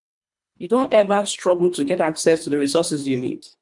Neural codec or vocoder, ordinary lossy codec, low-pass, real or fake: codec, 24 kHz, 3 kbps, HILCodec; none; 10.8 kHz; fake